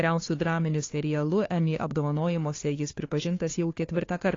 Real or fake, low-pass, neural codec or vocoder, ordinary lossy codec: fake; 7.2 kHz; codec, 16 kHz, 2 kbps, FunCodec, trained on Chinese and English, 25 frames a second; AAC, 32 kbps